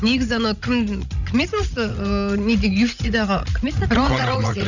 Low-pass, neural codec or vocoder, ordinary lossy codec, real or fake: 7.2 kHz; codec, 16 kHz, 16 kbps, FreqCodec, larger model; none; fake